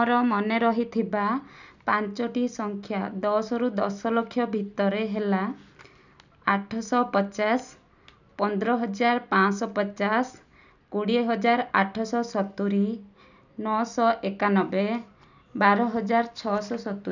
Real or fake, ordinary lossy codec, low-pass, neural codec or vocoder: real; none; 7.2 kHz; none